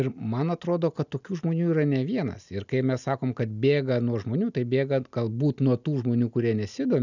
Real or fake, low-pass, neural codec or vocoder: real; 7.2 kHz; none